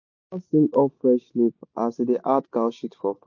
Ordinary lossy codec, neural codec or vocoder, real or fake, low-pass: MP3, 48 kbps; none; real; 7.2 kHz